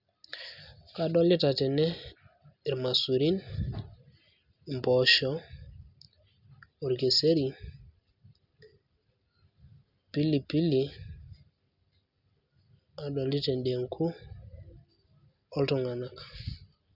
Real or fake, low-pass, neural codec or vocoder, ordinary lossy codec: real; 5.4 kHz; none; none